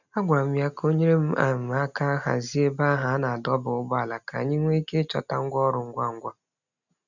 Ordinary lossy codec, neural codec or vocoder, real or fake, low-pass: none; none; real; 7.2 kHz